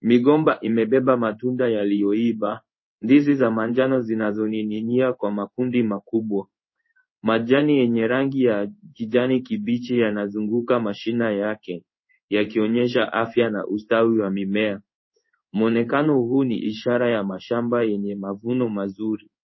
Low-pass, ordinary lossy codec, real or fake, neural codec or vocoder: 7.2 kHz; MP3, 24 kbps; fake; codec, 16 kHz in and 24 kHz out, 1 kbps, XY-Tokenizer